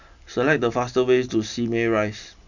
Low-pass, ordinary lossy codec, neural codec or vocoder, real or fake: 7.2 kHz; none; none; real